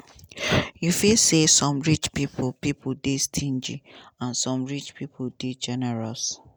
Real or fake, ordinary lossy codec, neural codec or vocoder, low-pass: real; none; none; none